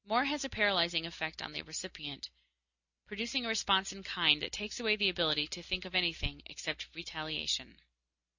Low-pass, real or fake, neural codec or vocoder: 7.2 kHz; real; none